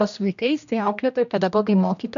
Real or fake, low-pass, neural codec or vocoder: fake; 7.2 kHz; codec, 16 kHz, 1 kbps, X-Codec, HuBERT features, trained on general audio